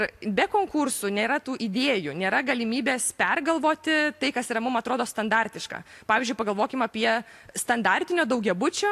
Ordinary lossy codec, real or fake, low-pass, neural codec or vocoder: AAC, 64 kbps; real; 14.4 kHz; none